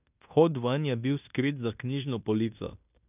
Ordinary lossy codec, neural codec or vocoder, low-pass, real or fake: none; codec, 16 kHz in and 24 kHz out, 0.9 kbps, LongCat-Audio-Codec, fine tuned four codebook decoder; 3.6 kHz; fake